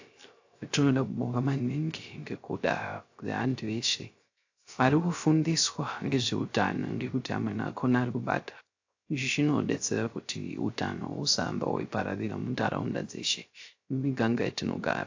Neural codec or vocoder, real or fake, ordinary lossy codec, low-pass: codec, 16 kHz, 0.3 kbps, FocalCodec; fake; AAC, 48 kbps; 7.2 kHz